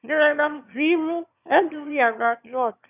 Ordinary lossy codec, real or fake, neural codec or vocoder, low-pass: none; fake; autoencoder, 22.05 kHz, a latent of 192 numbers a frame, VITS, trained on one speaker; 3.6 kHz